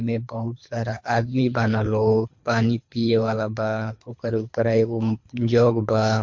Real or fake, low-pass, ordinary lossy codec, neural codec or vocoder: fake; 7.2 kHz; MP3, 48 kbps; codec, 24 kHz, 3 kbps, HILCodec